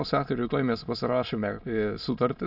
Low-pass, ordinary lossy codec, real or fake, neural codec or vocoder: 5.4 kHz; AAC, 48 kbps; fake; autoencoder, 22.05 kHz, a latent of 192 numbers a frame, VITS, trained on many speakers